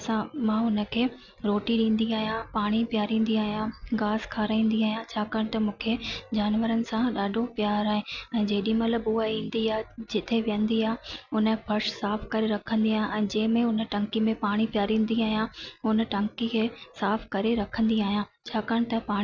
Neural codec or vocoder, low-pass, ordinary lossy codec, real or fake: none; 7.2 kHz; none; real